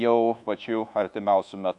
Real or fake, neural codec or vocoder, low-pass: fake; codec, 24 kHz, 1.2 kbps, DualCodec; 10.8 kHz